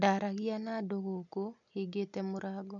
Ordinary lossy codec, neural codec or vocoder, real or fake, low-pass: none; none; real; 7.2 kHz